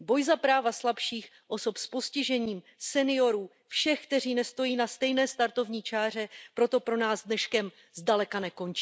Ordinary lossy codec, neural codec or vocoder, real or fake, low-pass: none; none; real; none